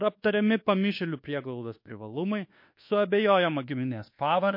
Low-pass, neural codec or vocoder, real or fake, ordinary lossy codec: 5.4 kHz; codec, 24 kHz, 1.2 kbps, DualCodec; fake; MP3, 32 kbps